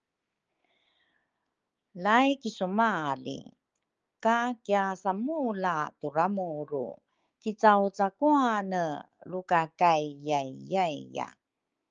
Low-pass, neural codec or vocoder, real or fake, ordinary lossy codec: 7.2 kHz; codec, 16 kHz, 6 kbps, DAC; fake; Opus, 24 kbps